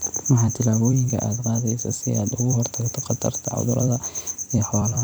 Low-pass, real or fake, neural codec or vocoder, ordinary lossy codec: none; fake; vocoder, 44.1 kHz, 128 mel bands every 256 samples, BigVGAN v2; none